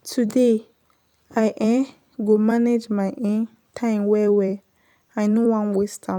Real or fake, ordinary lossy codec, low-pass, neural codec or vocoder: fake; none; 19.8 kHz; vocoder, 44.1 kHz, 128 mel bands every 256 samples, BigVGAN v2